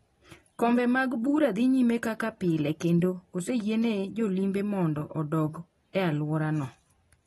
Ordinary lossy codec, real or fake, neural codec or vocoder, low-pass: AAC, 32 kbps; real; none; 19.8 kHz